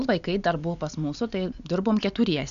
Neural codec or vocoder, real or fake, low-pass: none; real; 7.2 kHz